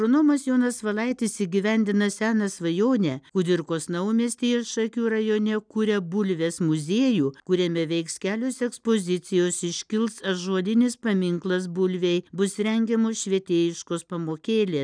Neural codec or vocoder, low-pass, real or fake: none; 9.9 kHz; real